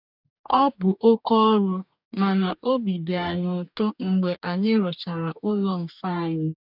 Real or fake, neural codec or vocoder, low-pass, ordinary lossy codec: fake; codec, 44.1 kHz, 2.6 kbps, DAC; 5.4 kHz; none